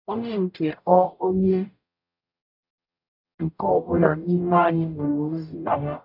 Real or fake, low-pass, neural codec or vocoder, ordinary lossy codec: fake; 5.4 kHz; codec, 44.1 kHz, 0.9 kbps, DAC; none